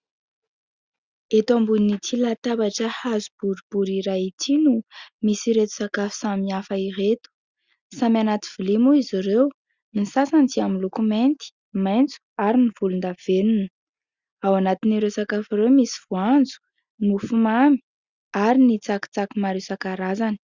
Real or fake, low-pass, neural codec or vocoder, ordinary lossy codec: real; 7.2 kHz; none; Opus, 64 kbps